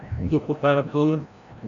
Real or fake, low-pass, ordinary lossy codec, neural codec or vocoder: fake; 7.2 kHz; MP3, 96 kbps; codec, 16 kHz, 0.5 kbps, FreqCodec, larger model